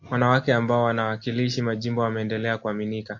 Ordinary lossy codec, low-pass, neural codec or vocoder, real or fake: AAC, 48 kbps; 7.2 kHz; none; real